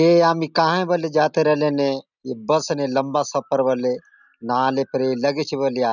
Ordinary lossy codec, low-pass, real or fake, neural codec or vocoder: none; 7.2 kHz; real; none